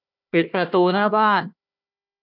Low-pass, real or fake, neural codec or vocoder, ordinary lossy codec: 5.4 kHz; fake; codec, 16 kHz, 1 kbps, FunCodec, trained on Chinese and English, 50 frames a second; none